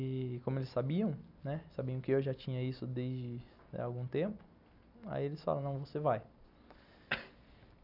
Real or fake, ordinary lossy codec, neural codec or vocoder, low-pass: real; none; none; 5.4 kHz